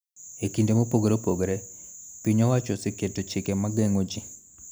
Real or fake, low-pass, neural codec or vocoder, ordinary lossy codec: real; none; none; none